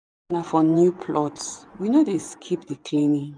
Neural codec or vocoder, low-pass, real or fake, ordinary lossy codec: vocoder, 24 kHz, 100 mel bands, Vocos; 9.9 kHz; fake; none